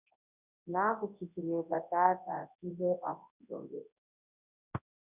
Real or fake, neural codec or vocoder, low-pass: fake; codec, 24 kHz, 0.9 kbps, WavTokenizer, large speech release; 3.6 kHz